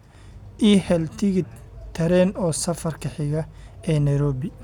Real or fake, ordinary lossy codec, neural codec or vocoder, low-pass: real; none; none; 19.8 kHz